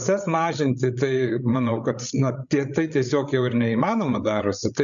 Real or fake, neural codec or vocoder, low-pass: fake; codec, 16 kHz, 8 kbps, FreqCodec, larger model; 7.2 kHz